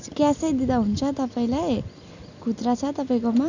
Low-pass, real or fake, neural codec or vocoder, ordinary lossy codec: 7.2 kHz; real; none; none